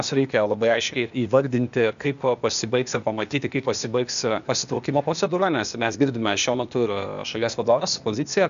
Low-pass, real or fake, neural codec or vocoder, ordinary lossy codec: 7.2 kHz; fake; codec, 16 kHz, 0.8 kbps, ZipCodec; AAC, 96 kbps